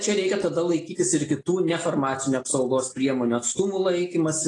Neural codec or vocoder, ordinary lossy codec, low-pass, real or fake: none; AAC, 32 kbps; 10.8 kHz; real